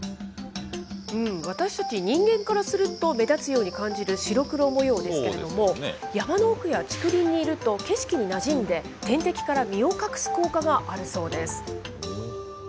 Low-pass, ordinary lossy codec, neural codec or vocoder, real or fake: none; none; none; real